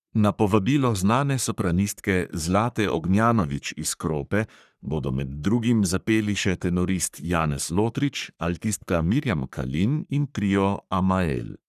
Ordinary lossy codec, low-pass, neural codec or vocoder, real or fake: none; 14.4 kHz; codec, 44.1 kHz, 3.4 kbps, Pupu-Codec; fake